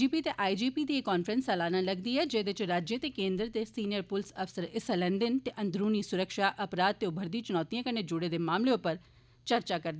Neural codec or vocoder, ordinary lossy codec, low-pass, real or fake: none; none; none; real